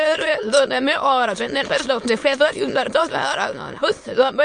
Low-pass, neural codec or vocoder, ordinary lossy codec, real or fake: 9.9 kHz; autoencoder, 22.05 kHz, a latent of 192 numbers a frame, VITS, trained on many speakers; MP3, 48 kbps; fake